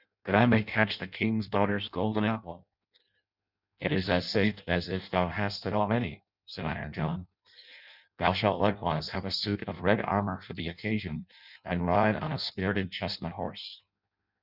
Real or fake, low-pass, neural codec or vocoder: fake; 5.4 kHz; codec, 16 kHz in and 24 kHz out, 0.6 kbps, FireRedTTS-2 codec